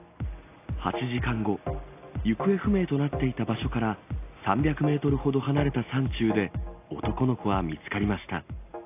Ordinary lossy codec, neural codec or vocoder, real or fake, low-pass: AAC, 24 kbps; none; real; 3.6 kHz